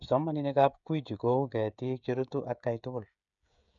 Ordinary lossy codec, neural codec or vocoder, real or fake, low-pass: MP3, 96 kbps; codec, 16 kHz, 16 kbps, FreqCodec, smaller model; fake; 7.2 kHz